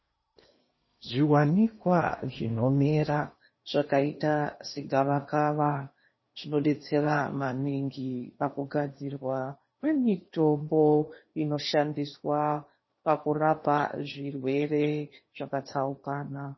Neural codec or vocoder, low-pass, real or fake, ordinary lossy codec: codec, 16 kHz in and 24 kHz out, 0.8 kbps, FocalCodec, streaming, 65536 codes; 7.2 kHz; fake; MP3, 24 kbps